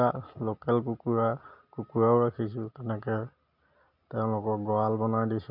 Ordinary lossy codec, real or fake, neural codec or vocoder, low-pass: none; real; none; 5.4 kHz